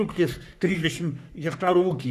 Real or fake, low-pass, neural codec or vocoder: fake; 14.4 kHz; codec, 44.1 kHz, 3.4 kbps, Pupu-Codec